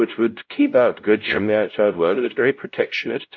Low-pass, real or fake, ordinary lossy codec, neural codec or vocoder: 7.2 kHz; fake; MP3, 64 kbps; codec, 16 kHz, 0.5 kbps, X-Codec, WavLM features, trained on Multilingual LibriSpeech